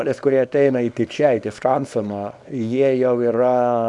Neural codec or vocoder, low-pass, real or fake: codec, 24 kHz, 0.9 kbps, WavTokenizer, small release; 10.8 kHz; fake